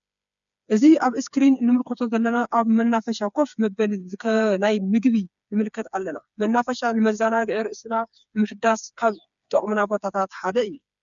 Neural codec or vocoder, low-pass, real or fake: codec, 16 kHz, 4 kbps, FreqCodec, smaller model; 7.2 kHz; fake